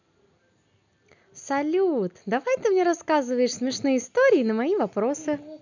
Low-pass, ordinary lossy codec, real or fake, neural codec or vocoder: 7.2 kHz; none; real; none